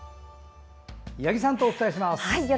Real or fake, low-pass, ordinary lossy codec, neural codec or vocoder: real; none; none; none